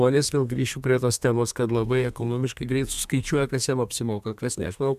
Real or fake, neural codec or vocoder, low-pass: fake; codec, 32 kHz, 1.9 kbps, SNAC; 14.4 kHz